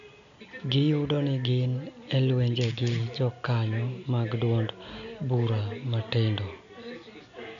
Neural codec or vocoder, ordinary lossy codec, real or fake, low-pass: none; none; real; 7.2 kHz